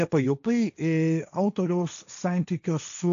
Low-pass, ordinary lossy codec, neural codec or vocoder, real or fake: 7.2 kHz; AAC, 64 kbps; codec, 16 kHz, 1.1 kbps, Voila-Tokenizer; fake